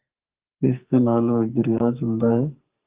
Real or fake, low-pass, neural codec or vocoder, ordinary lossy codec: fake; 3.6 kHz; codec, 44.1 kHz, 2.6 kbps, SNAC; Opus, 32 kbps